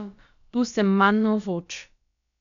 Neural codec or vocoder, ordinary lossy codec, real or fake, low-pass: codec, 16 kHz, about 1 kbps, DyCAST, with the encoder's durations; MP3, 96 kbps; fake; 7.2 kHz